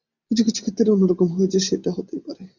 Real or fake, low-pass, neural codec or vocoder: real; 7.2 kHz; none